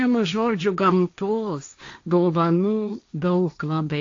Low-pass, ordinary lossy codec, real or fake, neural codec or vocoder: 7.2 kHz; AAC, 48 kbps; fake; codec, 16 kHz, 1.1 kbps, Voila-Tokenizer